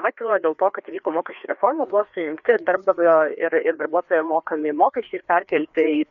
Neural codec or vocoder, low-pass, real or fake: codec, 16 kHz, 2 kbps, FreqCodec, larger model; 7.2 kHz; fake